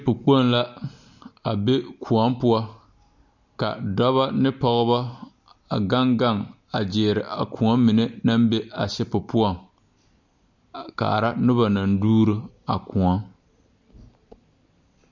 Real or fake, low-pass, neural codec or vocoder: real; 7.2 kHz; none